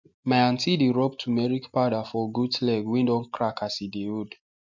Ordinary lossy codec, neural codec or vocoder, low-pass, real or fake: MP3, 64 kbps; none; 7.2 kHz; real